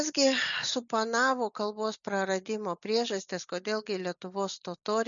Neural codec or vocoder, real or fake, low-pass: none; real; 7.2 kHz